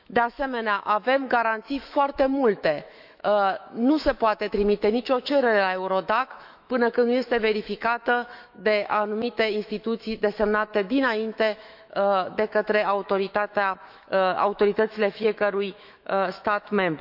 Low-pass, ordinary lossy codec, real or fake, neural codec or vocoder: 5.4 kHz; none; fake; autoencoder, 48 kHz, 128 numbers a frame, DAC-VAE, trained on Japanese speech